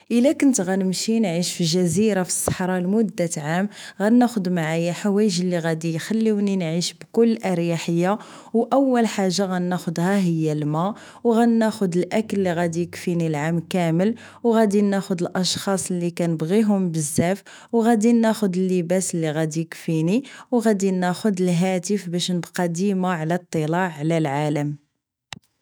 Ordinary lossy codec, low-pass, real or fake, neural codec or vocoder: none; none; fake; autoencoder, 48 kHz, 128 numbers a frame, DAC-VAE, trained on Japanese speech